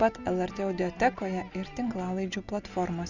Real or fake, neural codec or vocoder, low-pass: real; none; 7.2 kHz